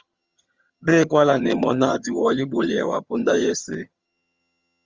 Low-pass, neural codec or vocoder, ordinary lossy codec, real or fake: 7.2 kHz; vocoder, 22.05 kHz, 80 mel bands, HiFi-GAN; Opus, 32 kbps; fake